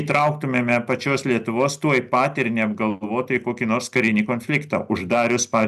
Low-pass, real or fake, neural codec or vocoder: 14.4 kHz; real; none